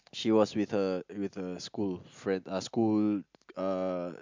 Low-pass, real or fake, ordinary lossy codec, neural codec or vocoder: 7.2 kHz; real; MP3, 64 kbps; none